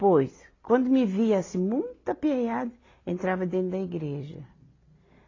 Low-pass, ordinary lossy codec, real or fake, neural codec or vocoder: 7.2 kHz; AAC, 32 kbps; real; none